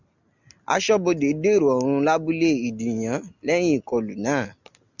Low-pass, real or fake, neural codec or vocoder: 7.2 kHz; real; none